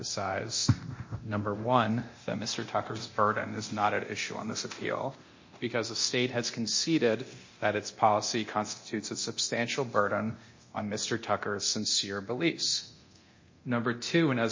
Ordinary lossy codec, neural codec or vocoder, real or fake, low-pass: MP3, 32 kbps; codec, 24 kHz, 0.9 kbps, DualCodec; fake; 7.2 kHz